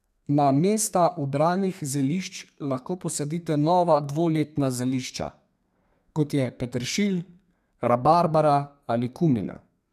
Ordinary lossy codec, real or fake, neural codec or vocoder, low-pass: none; fake; codec, 32 kHz, 1.9 kbps, SNAC; 14.4 kHz